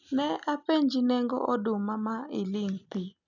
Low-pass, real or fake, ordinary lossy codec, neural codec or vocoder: 7.2 kHz; real; none; none